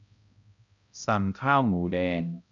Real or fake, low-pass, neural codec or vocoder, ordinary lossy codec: fake; 7.2 kHz; codec, 16 kHz, 0.5 kbps, X-Codec, HuBERT features, trained on balanced general audio; MP3, 96 kbps